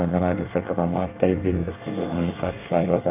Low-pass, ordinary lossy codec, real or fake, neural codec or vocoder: 3.6 kHz; none; fake; codec, 24 kHz, 1 kbps, SNAC